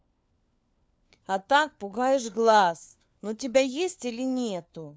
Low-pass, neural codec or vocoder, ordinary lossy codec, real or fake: none; codec, 16 kHz, 4 kbps, FunCodec, trained on LibriTTS, 50 frames a second; none; fake